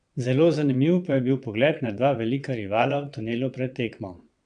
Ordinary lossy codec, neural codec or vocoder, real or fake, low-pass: MP3, 96 kbps; vocoder, 22.05 kHz, 80 mel bands, WaveNeXt; fake; 9.9 kHz